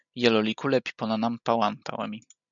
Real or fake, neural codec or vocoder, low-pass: real; none; 7.2 kHz